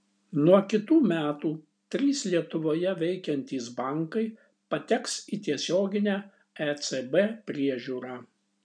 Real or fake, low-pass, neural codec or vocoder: real; 9.9 kHz; none